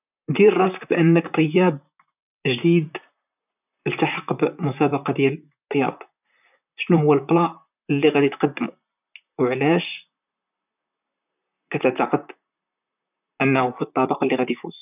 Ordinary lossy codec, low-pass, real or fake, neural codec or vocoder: none; 3.6 kHz; fake; vocoder, 44.1 kHz, 128 mel bands, Pupu-Vocoder